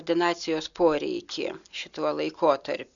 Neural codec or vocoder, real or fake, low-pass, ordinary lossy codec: none; real; 7.2 kHz; MP3, 96 kbps